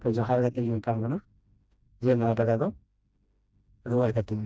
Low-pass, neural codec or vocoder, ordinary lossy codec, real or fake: none; codec, 16 kHz, 1 kbps, FreqCodec, smaller model; none; fake